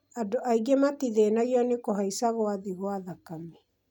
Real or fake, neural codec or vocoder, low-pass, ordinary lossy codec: real; none; 19.8 kHz; none